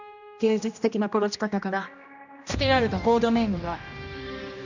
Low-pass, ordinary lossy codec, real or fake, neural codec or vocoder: 7.2 kHz; none; fake; codec, 16 kHz, 1 kbps, X-Codec, HuBERT features, trained on general audio